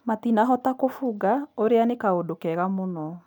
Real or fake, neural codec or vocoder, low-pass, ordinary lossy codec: real; none; 19.8 kHz; none